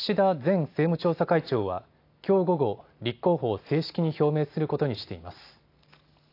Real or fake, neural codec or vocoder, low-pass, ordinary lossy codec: real; none; 5.4 kHz; AAC, 32 kbps